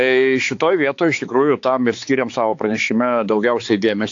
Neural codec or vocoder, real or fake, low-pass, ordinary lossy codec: codec, 16 kHz, 4 kbps, X-Codec, HuBERT features, trained on balanced general audio; fake; 7.2 kHz; AAC, 48 kbps